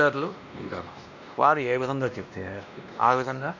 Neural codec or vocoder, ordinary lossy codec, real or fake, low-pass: codec, 16 kHz, 1 kbps, X-Codec, WavLM features, trained on Multilingual LibriSpeech; none; fake; 7.2 kHz